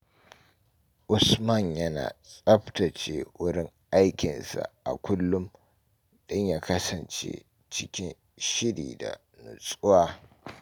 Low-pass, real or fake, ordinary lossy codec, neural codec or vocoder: none; real; none; none